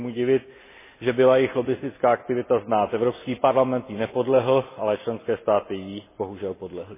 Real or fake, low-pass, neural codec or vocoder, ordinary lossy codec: real; 3.6 kHz; none; MP3, 16 kbps